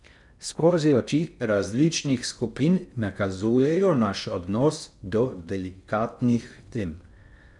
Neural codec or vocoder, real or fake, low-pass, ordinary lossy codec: codec, 16 kHz in and 24 kHz out, 0.6 kbps, FocalCodec, streaming, 4096 codes; fake; 10.8 kHz; none